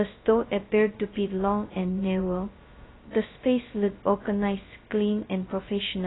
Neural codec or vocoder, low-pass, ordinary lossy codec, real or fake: codec, 16 kHz, 0.2 kbps, FocalCodec; 7.2 kHz; AAC, 16 kbps; fake